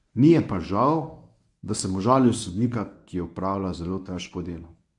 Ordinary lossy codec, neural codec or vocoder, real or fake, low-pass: AAC, 64 kbps; codec, 24 kHz, 0.9 kbps, WavTokenizer, medium speech release version 1; fake; 10.8 kHz